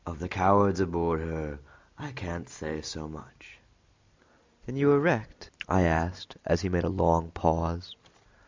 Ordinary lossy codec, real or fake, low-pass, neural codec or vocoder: MP3, 64 kbps; real; 7.2 kHz; none